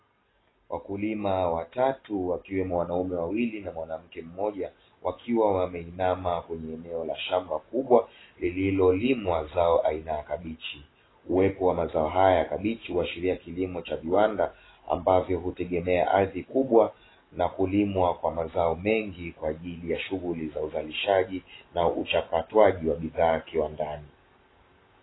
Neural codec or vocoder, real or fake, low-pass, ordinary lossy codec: none; real; 7.2 kHz; AAC, 16 kbps